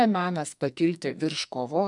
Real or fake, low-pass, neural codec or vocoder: fake; 10.8 kHz; codec, 44.1 kHz, 2.6 kbps, SNAC